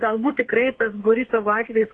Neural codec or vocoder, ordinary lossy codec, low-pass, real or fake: codec, 32 kHz, 1.9 kbps, SNAC; AAC, 32 kbps; 10.8 kHz; fake